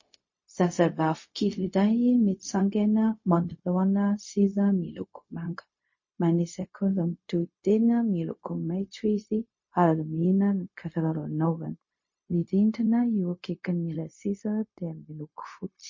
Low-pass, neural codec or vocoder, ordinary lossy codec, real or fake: 7.2 kHz; codec, 16 kHz, 0.4 kbps, LongCat-Audio-Codec; MP3, 32 kbps; fake